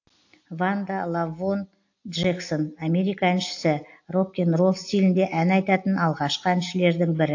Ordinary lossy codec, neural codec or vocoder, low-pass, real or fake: AAC, 48 kbps; none; 7.2 kHz; real